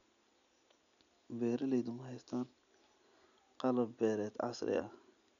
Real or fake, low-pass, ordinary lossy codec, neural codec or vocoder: real; 7.2 kHz; none; none